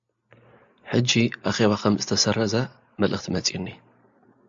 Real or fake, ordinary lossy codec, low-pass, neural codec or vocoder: real; AAC, 64 kbps; 7.2 kHz; none